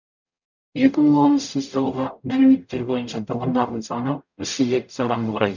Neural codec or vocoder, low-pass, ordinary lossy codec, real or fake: codec, 44.1 kHz, 0.9 kbps, DAC; 7.2 kHz; none; fake